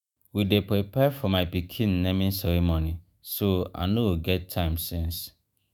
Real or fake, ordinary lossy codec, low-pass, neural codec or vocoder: fake; none; none; autoencoder, 48 kHz, 128 numbers a frame, DAC-VAE, trained on Japanese speech